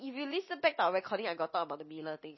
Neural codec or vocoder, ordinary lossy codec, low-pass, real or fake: none; MP3, 24 kbps; 7.2 kHz; real